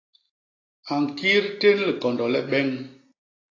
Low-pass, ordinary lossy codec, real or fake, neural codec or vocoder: 7.2 kHz; AAC, 32 kbps; real; none